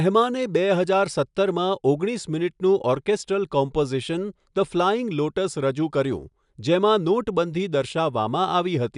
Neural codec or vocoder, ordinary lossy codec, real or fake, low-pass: none; none; real; 10.8 kHz